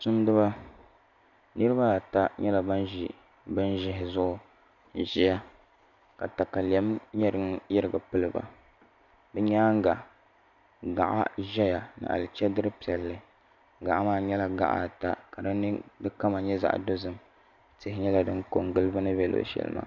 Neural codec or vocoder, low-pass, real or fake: none; 7.2 kHz; real